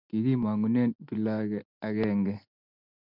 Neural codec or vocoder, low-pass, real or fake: none; 5.4 kHz; real